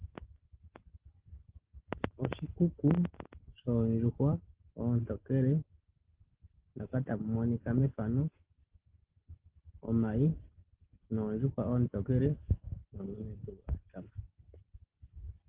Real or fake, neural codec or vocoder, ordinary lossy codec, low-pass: real; none; Opus, 16 kbps; 3.6 kHz